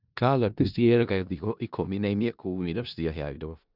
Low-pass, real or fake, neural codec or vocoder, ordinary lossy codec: 5.4 kHz; fake; codec, 16 kHz in and 24 kHz out, 0.4 kbps, LongCat-Audio-Codec, four codebook decoder; none